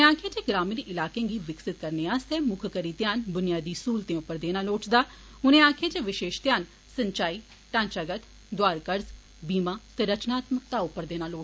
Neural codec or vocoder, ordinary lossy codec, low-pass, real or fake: none; none; none; real